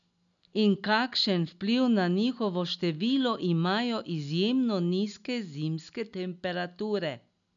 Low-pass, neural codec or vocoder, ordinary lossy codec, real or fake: 7.2 kHz; none; none; real